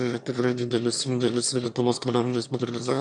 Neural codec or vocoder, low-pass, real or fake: autoencoder, 22.05 kHz, a latent of 192 numbers a frame, VITS, trained on one speaker; 9.9 kHz; fake